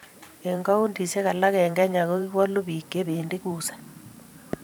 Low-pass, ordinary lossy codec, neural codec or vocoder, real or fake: none; none; vocoder, 44.1 kHz, 128 mel bands every 512 samples, BigVGAN v2; fake